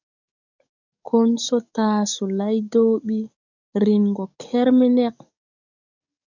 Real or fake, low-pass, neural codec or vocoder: fake; 7.2 kHz; codec, 44.1 kHz, 7.8 kbps, DAC